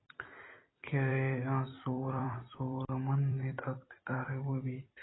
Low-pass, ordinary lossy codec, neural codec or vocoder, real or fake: 7.2 kHz; AAC, 16 kbps; vocoder, 44.1 kHz, 128 mel bands every 256 samples, BigVGAN v2; fake